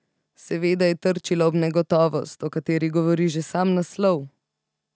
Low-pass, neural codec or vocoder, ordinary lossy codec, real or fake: none; none; none; real